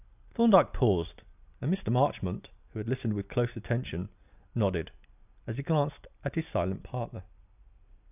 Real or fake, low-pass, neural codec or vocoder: real; 3.6 kHz; none